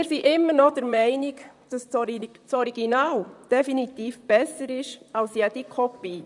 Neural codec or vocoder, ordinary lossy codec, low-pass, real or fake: vocoder, 44.1 kHz, 128 mel bands, Pupu-Vocoder; none; 10.8 kHz; fake